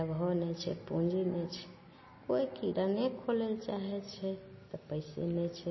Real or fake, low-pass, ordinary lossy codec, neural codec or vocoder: real; 7.2 kHz; MP3, 24 kbps; none